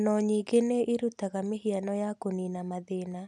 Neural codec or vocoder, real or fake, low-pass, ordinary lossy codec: none; real; none; none